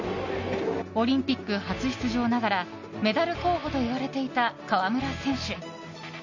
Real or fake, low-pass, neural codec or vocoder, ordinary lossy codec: real; 7.2 kHz; none; none